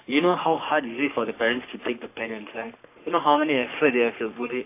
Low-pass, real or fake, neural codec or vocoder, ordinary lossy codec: 3.6 kHz; fake; codec, 44.1 kHz, 3.4 kbps, Pupu-Codec; AAC, 24 kbps